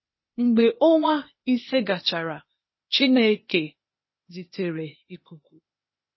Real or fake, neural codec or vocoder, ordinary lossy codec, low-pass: fake; codec, 16 kHz, 0.8 kbps, ZipCodec; MP3, 24 kbps; 7.2 kHz